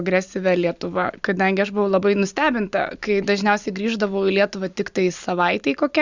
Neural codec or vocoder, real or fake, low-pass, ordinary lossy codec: none; real; 7.2 kHz; Opus, 64 kbps